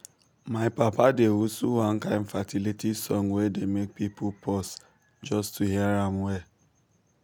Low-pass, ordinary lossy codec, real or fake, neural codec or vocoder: none; none; real; none